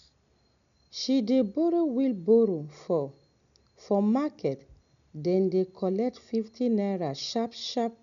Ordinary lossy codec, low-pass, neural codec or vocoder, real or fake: none; 7.2 kHz; none; real